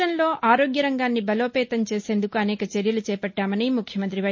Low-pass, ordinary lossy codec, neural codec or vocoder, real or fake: 7.2 kHz; MP3, 32 kbps; none; real